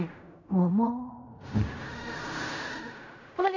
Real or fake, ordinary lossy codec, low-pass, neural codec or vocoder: fake; none; 7.2 kHz; codec, 16 kHz in and 24 kHz out, 0.4 kbps, LongCat-Audio-Codec, fine tuned four codebook decoder